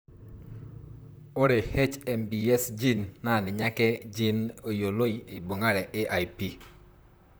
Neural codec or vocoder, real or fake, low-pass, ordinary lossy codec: vocoder, 44.1 kHz, 128 mel bands, Pupu-Vocoder; fake; none; none